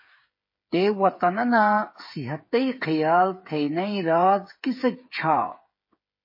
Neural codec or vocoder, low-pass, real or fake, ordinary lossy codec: codec, 16 kHz, 8 kbps, FreqCodec, smaller model; 5.4 kHz; fake; MP3, 24 kbps